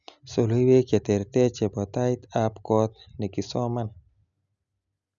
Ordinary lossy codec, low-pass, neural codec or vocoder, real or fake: none; 7.2 kHz; none; real